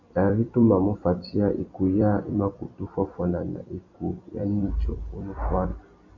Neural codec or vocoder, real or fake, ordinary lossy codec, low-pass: vocoder, 44.1 kHz, 128 mel bands every 256 samples, BigVGAN v2; fake; MP3, 64 kbps; 7.2 kHz